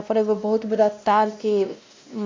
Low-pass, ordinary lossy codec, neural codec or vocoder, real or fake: 7.2 kHz; MP3, 48 kbps; codec, 16 kHz, 1 kbps, X-Codec, WavLM features, trained on Multilingual LibriSpeech; fake